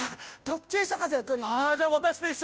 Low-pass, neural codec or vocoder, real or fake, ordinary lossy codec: none; codec, 16 kHz, 0.5 kbps, FunCodec, trained on Chinese and English, 25 frames a second; fake; none